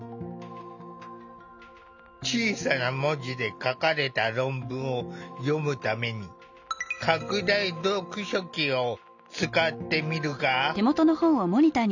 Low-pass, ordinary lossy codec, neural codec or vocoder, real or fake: 7.2 kHz; none; none; real